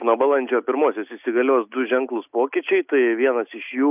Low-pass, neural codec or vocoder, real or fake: 3.6 kHz; none; real